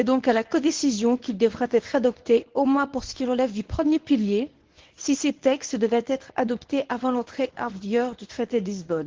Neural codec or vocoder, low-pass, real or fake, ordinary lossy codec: codec, 24 kHz, 0.9 kbps, WavTokenizer, medium speech release version 1; 7.2 kHz; fake; Opus, 16 kbps